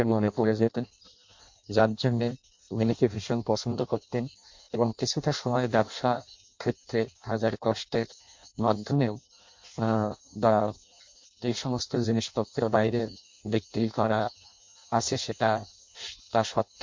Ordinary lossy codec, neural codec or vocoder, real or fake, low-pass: MP3, 48 kbps; codec, 16 kHz in and 24 kHz out, 0.6 kbps, FireRedTTS-2 codec; fake; 7.2 kHz